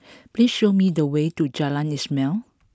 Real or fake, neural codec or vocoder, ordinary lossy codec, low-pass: real; none; none; none